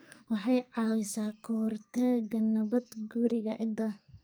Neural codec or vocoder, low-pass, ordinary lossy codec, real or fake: codec, 44.1 kHz, 2.6 kbps, SNAC; none; none; fake